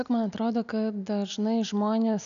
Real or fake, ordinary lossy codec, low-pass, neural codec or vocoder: real; MP3, 96 kbps; 7.2 kHz; none